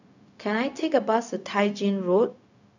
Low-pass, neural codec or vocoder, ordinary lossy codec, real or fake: 7.2 kHz; codec, 16 kHz, 0.4 kbps, LongCat-Audio-Codec; none; fake